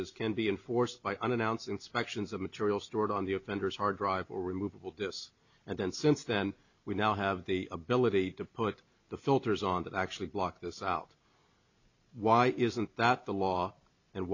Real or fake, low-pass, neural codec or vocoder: real; 7.2 kHz; none